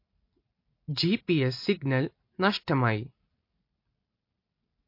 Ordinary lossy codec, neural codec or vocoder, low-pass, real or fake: MP3, 32 kbps; none; 5.4 kHz; real